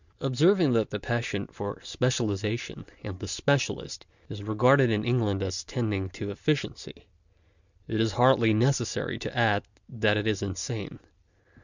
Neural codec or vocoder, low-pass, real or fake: none; 7.2 kHz; real